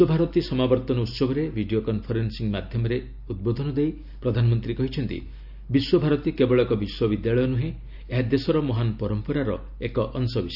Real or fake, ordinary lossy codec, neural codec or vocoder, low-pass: real; none; none; 5.4 kHz